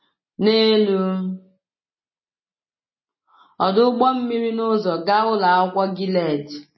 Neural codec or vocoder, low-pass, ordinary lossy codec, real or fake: none; 7.2 kHz; MP3, 24 kbps; real